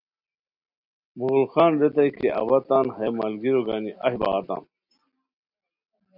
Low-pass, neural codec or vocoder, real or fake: 5.4 kHz; none; real